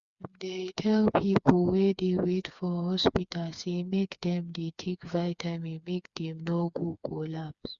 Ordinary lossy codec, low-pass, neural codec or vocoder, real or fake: Opus, 64 kbps; 7.2 kHz; codec, 16 kHz, 4 kbps, FreqCodec, smaller model; fake